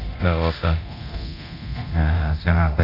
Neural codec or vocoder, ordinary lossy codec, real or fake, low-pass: codec, 24 kHz, 0.9 kbps, DualCodec; none; fake; 5.4 kHz